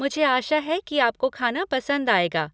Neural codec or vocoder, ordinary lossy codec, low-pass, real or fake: none; none; none; real